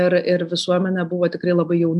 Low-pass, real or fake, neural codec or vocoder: 10.8 kHz; real; none